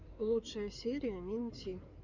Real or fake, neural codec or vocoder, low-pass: fake; codec, 16 kHz in and 24 kHz out, 2.2 kbps, FireRedTTS-2 codec; 7.2 kHz